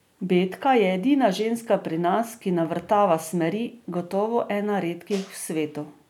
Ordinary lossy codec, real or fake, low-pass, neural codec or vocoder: none; real; 19.8 kHz; none